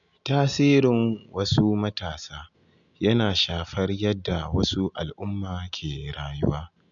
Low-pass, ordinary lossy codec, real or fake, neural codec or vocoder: 7.2 kHz; none; real; none